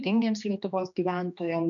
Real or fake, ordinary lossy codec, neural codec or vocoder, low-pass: fake; MP3, 96 kbps; codec, 16 kHz, 2 kbps, X-Codec, HuBERT features, trained on general audio; 7.2 kHz